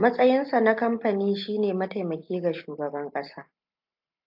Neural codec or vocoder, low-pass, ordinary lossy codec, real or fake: none; 5.4 kHz; AAC, 48 kbps; real